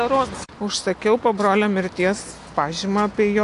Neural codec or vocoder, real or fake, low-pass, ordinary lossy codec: none; real; 10.8 kHz; AAC, 48 kbps